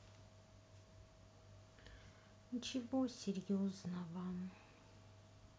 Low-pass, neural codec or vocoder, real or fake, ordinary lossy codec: none; none; real; none